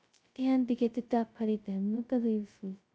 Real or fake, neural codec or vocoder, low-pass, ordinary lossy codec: fake; codec, 16 kHz, 0.2 kbps, FocalCodec; none; none